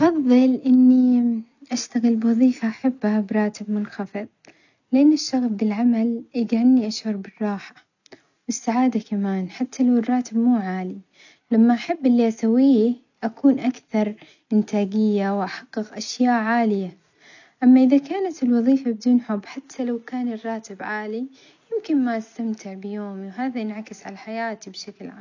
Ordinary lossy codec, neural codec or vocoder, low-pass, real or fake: none; none; 7.2 kHz; real